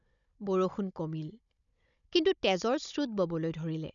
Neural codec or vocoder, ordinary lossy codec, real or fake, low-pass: codec, 16 kHz, 16 kbps, FunCodec, trained on Chinese and English, 50 frames a second; none; fake; 7.2 kHz